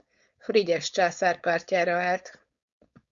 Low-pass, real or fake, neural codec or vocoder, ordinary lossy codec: 7.2 kHz; fake; codec, 16 kHz, 4.8 kbps, FACodec; Opus, 64 kbps